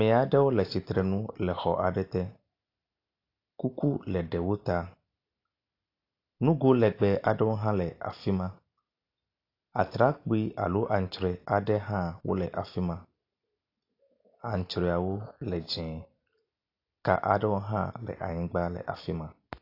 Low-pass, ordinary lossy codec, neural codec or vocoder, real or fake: 5.4 kHz; AAC, 32 kbps; none; real